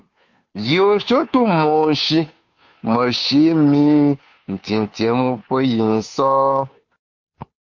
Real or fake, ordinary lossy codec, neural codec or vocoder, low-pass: fake; MP3, 48 kbps; codec, 16 kHz, 2 kbps, FunCodec, trained on Chinese and English, 25 frames a second; 7.2 kHz